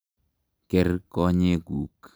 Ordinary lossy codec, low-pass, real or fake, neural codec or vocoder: none; none; real; none